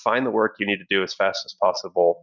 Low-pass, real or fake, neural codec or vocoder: 7.2 kHz; real; none